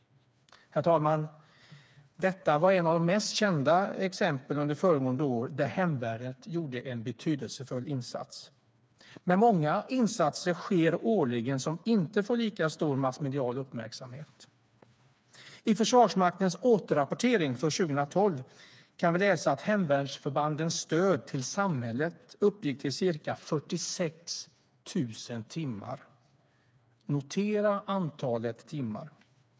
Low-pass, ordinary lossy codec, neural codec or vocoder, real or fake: none; none; codec, 16 kHz, 4 kbps, FreqCodec, smaller model; fake